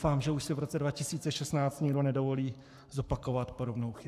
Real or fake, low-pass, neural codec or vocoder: fake; 14.4 kHz; codec, 44.1 kHz, 7.8 kbps, Pupu-Codec